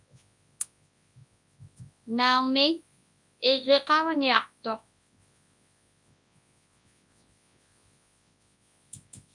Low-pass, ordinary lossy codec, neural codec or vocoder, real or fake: 10.8 kHz; MP3, 96 kbps; codec, 24 kHz, 0.9 kbps, WavTokenizer, large speech release; fake